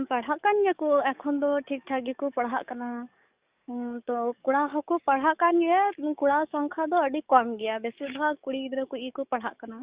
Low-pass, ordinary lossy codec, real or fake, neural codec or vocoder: 3.6 kHz; Opus, 64 kbps; fake; codec, 44.1 kHz, 7.8 kbps, DAC